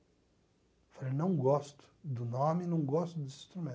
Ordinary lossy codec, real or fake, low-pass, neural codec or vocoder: none; real; none; none